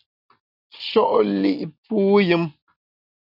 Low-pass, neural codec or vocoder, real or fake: 5.4 kHz; none; real